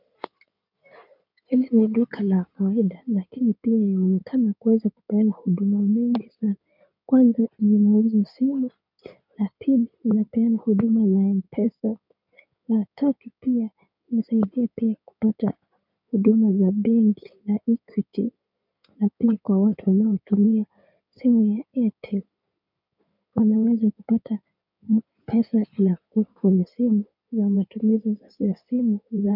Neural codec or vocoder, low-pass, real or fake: codec, 16 kHz in and 24 kHz out, 2.2 kbps, FireRedTTS-2 codec; 5.4 kHz; fake